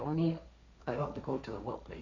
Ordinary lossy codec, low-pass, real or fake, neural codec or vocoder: none; 7.2 kHz; fake; codec, 16 kHz, 1.1 kbps, Voila-Tokenizer